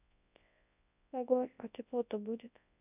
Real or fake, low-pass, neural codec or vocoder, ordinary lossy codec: fake; 3.6 kHz; codec, 24 kHz, 0.9 kbps, WavTokenizer, large speech release; none